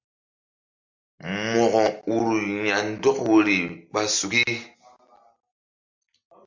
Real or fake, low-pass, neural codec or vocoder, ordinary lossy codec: real; 7.2 kHz; none; MP3, 64 kbps